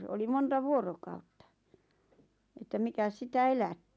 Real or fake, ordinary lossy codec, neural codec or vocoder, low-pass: real; none; none; none